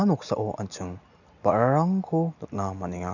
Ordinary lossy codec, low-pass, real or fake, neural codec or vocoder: none; 7.2 kHz; real; none